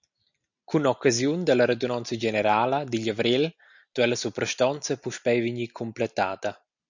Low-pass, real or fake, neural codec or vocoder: 7.2 kHz; real; none